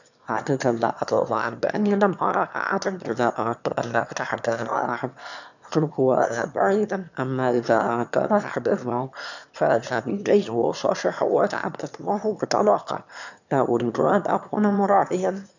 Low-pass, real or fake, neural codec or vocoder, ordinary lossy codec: 7.2 kHz; fake; autoencoder, 22.05 kHz, a latent of 192 numbers a frame, VITS, trained on one speaker; none